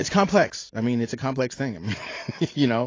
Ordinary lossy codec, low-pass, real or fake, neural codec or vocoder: AAC, 32 kbps; 7.2 kHz; fake; vocoder, 44.1 kHz, 80 mel bands, Vocos